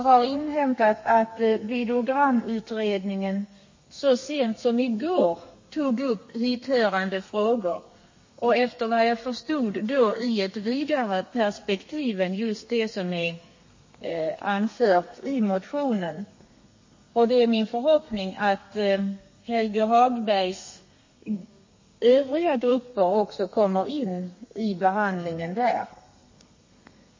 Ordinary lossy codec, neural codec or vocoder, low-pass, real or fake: MP3, 32 kbps; codec, 32 kHz, 1.9 kbps, SNAC; 7.2 kHz; fake